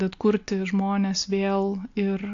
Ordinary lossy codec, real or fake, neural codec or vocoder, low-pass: AAC, 48 kbps; real; none; 7.2 kHz